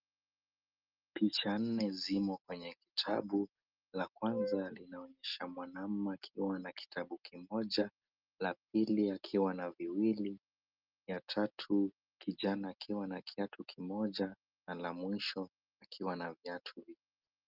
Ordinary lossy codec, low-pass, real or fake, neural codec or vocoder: Opus, 24 kbps; 5.4 kHz; real; none